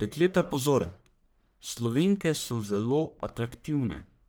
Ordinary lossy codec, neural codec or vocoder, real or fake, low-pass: none; codec, 44.1 kHz, 1.7 kbps, Pupu-Codec; fake; none